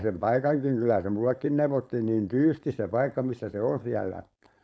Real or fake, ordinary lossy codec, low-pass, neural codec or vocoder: fake; none; none; codec, 16 kHz, 4.8 kbps, FACodec